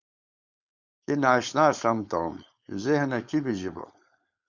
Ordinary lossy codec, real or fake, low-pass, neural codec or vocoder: Opus, 64 kbps; fake; 7.2 kHz; codec, 16 kHz, 4.8 kbps, FACodec